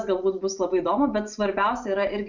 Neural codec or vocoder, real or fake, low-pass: none; real; 7.2 kHz